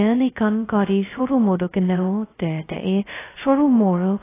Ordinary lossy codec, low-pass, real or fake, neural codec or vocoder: AAC, 16 kbps; 3.6 kHz; fake; codec, 16 kHz, 0.2 kbps, FocalCodec